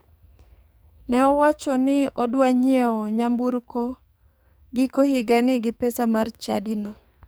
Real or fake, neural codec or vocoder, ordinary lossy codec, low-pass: fake; codec, 44.1 kHz, 2.6 kbps, SNAC; none; none